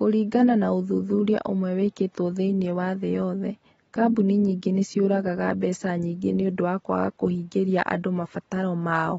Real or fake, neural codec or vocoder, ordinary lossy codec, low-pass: real; none; AAC, 24 kbps; 19.8 kHz